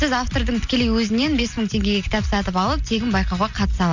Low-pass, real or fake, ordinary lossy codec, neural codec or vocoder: 7.2 kHz; real; none; none